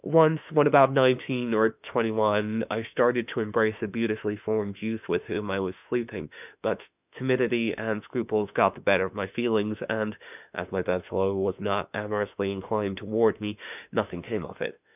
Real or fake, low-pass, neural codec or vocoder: fake; 3.6 kHz; autoencoder, 48 kHz, 32 numbers a frame, DAC-VAE, trained on Japanese speech